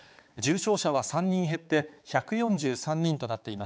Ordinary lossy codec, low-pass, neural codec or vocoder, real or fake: none; none; codec, 16 kHz, 4 kbps, X-Codec, HuBERT features, trained on balanced general audio; fake